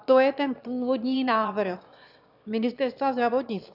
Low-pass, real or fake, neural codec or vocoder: 5.4 kHz; fake; autoencoder, 22.05 kHz, a latent of 192 numbers a frame, VITS, trained on one speaker